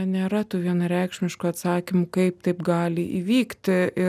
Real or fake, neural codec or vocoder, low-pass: real; none; 14.4 kHz